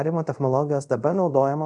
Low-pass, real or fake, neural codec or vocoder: 10.8 kHz; fake; codec, 24 kHz, 0.5 kbps, DualCodec